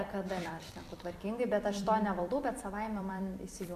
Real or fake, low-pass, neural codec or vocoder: fake; 14.4 kHz; vocoder, 44.1 kHz, 128 mel bands every 256 samples, BigVGAN v2